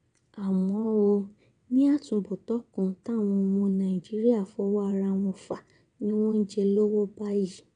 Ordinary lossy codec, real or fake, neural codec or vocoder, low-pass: none; fake; vocoder, 22.05 kHz, 80 mel bands, WaveNeXt; 9.9 kHz